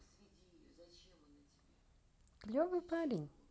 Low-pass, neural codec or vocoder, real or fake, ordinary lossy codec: none; none; real; none